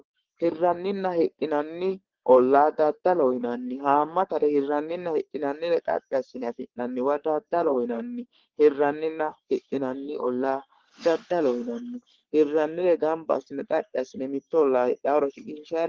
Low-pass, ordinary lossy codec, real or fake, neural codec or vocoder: 7.2 kHz; Opus, 16 kbps; fake; codec, 44.1 kHz, 3.4 kbps, Pupu-Codec